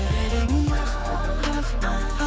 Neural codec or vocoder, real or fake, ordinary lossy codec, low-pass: codec, 16 kHz, 4 kbps, X-Codec, HuBERT features, trained on general audio; fake; none; none